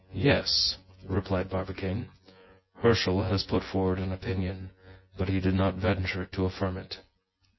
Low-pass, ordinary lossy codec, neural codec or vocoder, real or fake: 7.2 kHz; MP3, 24 kbps; vocoder, 24 kHz, 100 mel bands, Vocos; fake